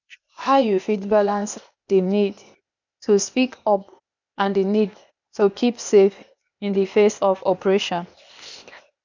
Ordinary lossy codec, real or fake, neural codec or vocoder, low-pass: none; fake; codec, 16 kHz, 0.8 kbps, ZipCodec; 7.2 kHz